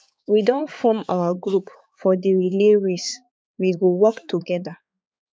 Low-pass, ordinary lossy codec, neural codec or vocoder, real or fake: none; none; codec, 16 kHz, 4 kbps, X-Codec, HuBERT features, trained on balanced general audio; fake